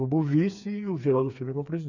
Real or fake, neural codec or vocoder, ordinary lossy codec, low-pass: fake; codec, 16 kHz, 4 kbps, FreqCodec, smaller model; none; 7.2 kHz